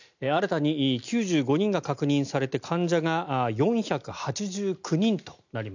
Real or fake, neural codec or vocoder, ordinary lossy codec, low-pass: real; none; none; 7.2 kHz